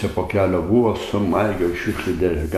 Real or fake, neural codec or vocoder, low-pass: real; none; 14.4 kHz